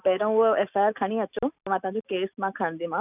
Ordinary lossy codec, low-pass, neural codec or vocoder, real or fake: none; 3.6 kHz; none; real